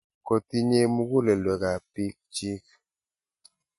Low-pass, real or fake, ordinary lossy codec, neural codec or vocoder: 14.4 kHz; real; MP3, 48 kbps; none